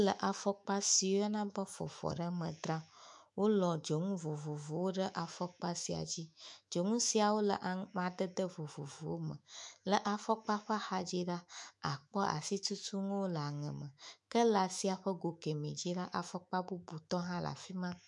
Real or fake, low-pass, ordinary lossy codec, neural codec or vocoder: fake; 10.8 kHz; MP3, 64 kbps; autoencoder, 48 kHz, 128 numbers a frame, DAC-VAE, trained on Japanese speech